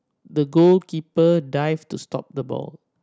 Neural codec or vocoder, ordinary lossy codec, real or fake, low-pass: none; none; real; none